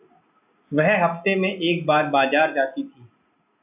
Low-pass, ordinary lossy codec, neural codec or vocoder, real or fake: 3.6 kHz; AAC, 32 kbps; none; real